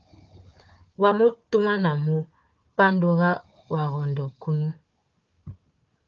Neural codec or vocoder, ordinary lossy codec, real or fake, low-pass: codec, 16 kHz, 4 kbps, FunCodec, trained on Chinese and English, 50 frames a second; Opus, 24 kbps; fake; 7.2 kHz